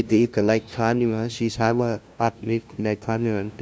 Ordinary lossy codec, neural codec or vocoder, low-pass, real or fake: none; codec, 16 kHz, 0.5 kbps, FunCodec, trained on LibriTTS, 25 frames a second; none; fake